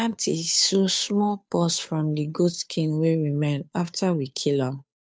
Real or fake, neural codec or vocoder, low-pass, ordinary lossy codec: fake; codec, 16 kHz, 2 kbps, FunCodec, trained on Chinese and English, 25 frames a second; none; none